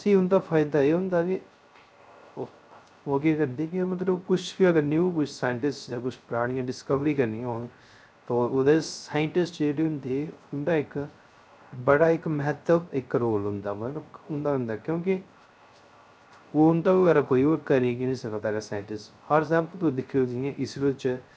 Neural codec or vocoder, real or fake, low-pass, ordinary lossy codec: codec, 16 kHz, 0.3 kbps, FocalCodec; fake; none; none